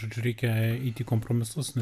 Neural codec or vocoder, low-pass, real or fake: vocoder, 44.1 kHz, 128 mel bands every 256 samples, BigVGAN v2; 14.4 kHz; fake